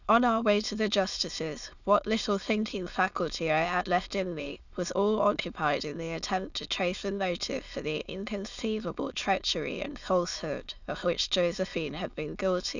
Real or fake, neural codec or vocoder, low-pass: fake; autoencoder, 22.05 kHz, a latent of 192 numbers a frame, VITS, trained on many speakers; 7.2 kHz